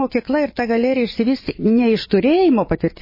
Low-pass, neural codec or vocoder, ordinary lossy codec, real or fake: 5.4 kHz; vocoder, 24 kHz, 100 mel bands, Vocos; MP3, 24 kbps; fake